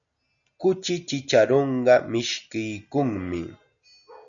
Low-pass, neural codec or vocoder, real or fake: 7.2 kHz; none; real